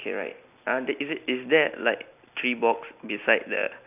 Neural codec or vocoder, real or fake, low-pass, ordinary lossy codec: none; real; 3.6 kHz; none